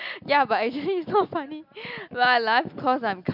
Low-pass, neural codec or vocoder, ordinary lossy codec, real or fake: 5.4 kHz; none; none; real